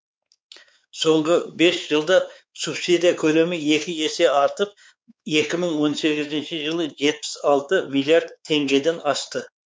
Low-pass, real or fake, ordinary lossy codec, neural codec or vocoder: none; fake; none; codec, 16 kHz, 4 kbps, X-Codec, WavLM features, trained on Multilingual LibriSpeech